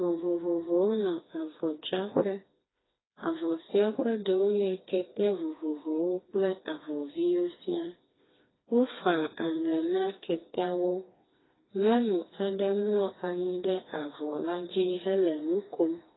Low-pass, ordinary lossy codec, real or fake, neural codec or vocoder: 7.2 kHz; AAC, 16 kbps; fake; codec, 16 kHz, 2 kbps, FreqCodec, smaller model